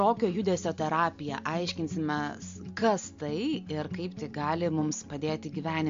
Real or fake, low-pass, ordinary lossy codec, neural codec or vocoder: real; 7.2 kHz; MP3, 48 kbps; none